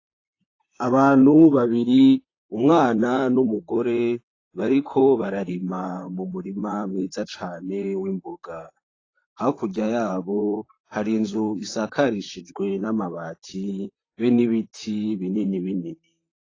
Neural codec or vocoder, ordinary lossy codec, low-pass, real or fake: vocoder, 44.1 kHz, 128 mel bands, Pupu-Vocoder; AAC, 32 kbps; 7.2 kHz; fake